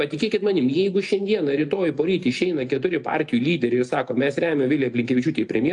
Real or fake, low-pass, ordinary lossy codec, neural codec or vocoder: real; 10.8 kHz; AAC, 64 kbps; none